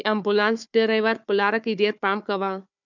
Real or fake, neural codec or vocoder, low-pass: fake; codec, 16 kHz, 4 kbps, FunCodec, trained on Chinese and English, 50 frames a second; 7.2 kHz